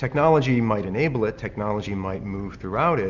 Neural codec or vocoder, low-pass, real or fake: none; 7.2 kHz; real